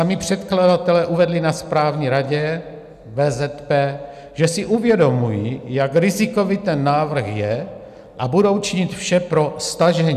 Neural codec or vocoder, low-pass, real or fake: none; 14.4 kHz; real